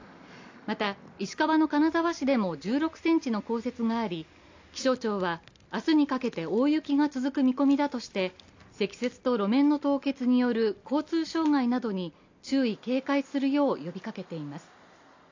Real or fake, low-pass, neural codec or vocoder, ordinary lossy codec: real; 7.2 kHz; none; AAC, 48 kbps